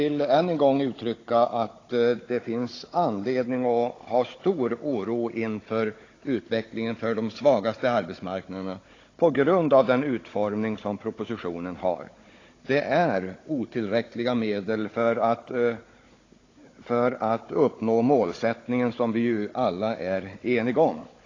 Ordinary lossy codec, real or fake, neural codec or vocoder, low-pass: AAC, 32 kbps; fake; codec, 16 kHz, 16 kbps, FunCodec, trained on Chinese and English, 50 frames a second; 7.2 kHz